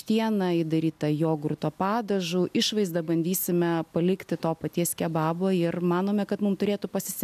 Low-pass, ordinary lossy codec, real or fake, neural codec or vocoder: 14.4 kHz; AAC, 96 kbps; real; none